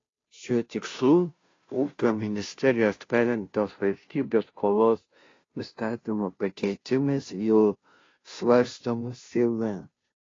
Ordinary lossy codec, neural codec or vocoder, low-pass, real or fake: AAC, 32 kbps; codec, 16 kHz, 0.5 kbps, FunCodec, trained on Chinese and English, 25 frames a second; 7.2 kHz; fake